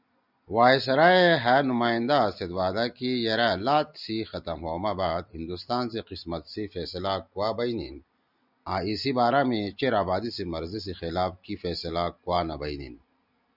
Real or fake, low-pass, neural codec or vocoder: real; 5.4 kHz; none